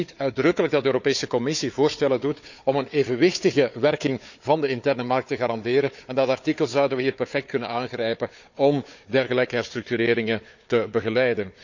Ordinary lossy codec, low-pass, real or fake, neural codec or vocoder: none; 7.2 kHz; fake; codec, 16 kHz, 4 kbps, FunCodec, trained on Chinese and English, 50 frames a second